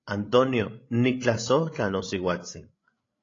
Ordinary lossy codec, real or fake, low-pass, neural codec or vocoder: AAC, 32 kbps; fake; 7.2 kHz; codec, 16 kHz, 16 kbps, FreqCodec, larger model